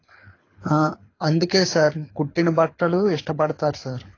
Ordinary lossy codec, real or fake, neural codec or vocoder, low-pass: AAC, 32 kbps; fake; codec, 24 kHz, 6 kbps, HILCodec; 7.2 kHz